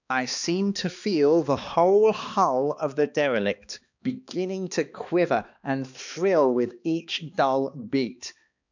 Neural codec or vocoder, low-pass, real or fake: codec, 16 kHz, 2 kbps, X-Codec, HuBERT features, trained on balanced general audio; 7.2 kHz; fake